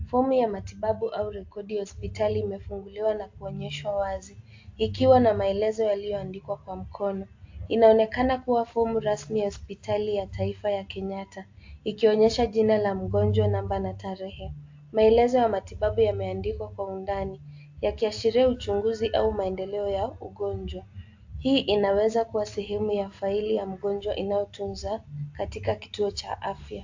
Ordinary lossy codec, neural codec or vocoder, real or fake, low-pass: AAC, 48 kbps; none; real; 7.2 kHz